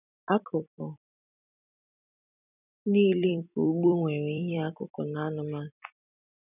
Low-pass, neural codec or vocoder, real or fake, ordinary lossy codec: 3.6 kHz; vocoder, 44.1 kHz, 128 mel bands every 256 samples, BigVGAN v2; fake; none